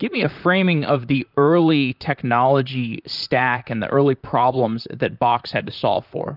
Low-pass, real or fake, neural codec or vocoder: 5.4 kHz; fake; vocoder, 44.1 kHz, 128 mel bands, Pupu-Vocoder